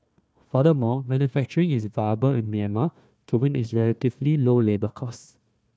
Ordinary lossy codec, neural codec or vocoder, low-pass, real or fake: none; codec, 16 kHz, 1 kbps, FunCodec, trained on Chinese and English, 50 frames a second; none; fake